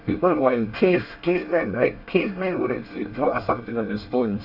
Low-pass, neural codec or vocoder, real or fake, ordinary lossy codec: 5.4 kHz; codec, 24 kHz, 1 kbps, SNAC; fake; none